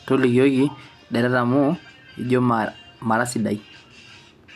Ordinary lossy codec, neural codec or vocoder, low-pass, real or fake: none; none; 14.4 kHz; real